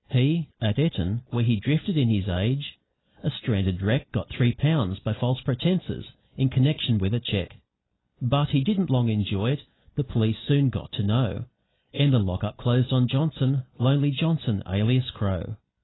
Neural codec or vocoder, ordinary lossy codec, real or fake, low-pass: none; AAC, 16 kbps; real; 7.2 kHz